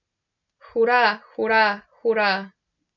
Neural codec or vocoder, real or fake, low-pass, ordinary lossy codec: none; real; 7.2 kHz; none